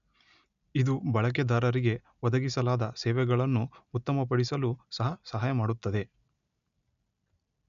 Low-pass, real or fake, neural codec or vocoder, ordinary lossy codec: 7.2 kHz; real; none; none